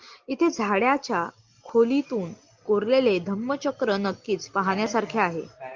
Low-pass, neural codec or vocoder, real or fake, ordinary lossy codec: 7.2 kHz; none; real; Opus, 24 kbps